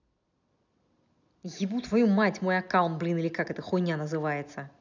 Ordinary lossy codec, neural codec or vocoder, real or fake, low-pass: none; none; real; 7.2 kHz